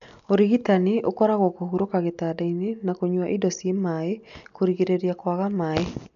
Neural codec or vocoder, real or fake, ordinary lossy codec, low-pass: none; real; none; 7.2 kHz